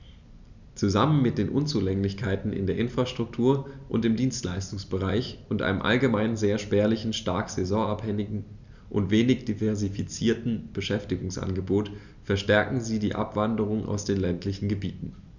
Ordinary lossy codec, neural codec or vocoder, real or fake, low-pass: none; none; real; 7.2 kHz